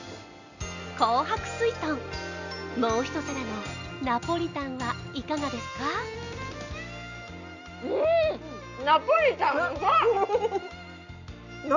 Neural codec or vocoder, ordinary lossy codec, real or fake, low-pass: none; none; real; 7.2 kHz